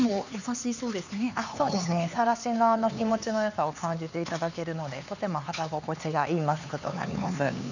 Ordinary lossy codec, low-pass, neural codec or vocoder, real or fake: none; 7.2 kHz; codec, 16 kHz, 4 kbps, X-Codec, HuBERT features, trained on LibriSpeech; fake